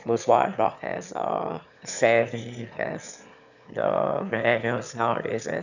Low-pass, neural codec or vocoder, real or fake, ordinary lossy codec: 7.2 kHz; autoencoder, 22.05 kHz, a latent of 192 numbers a frame, VITS, trained on one speaker; fake; none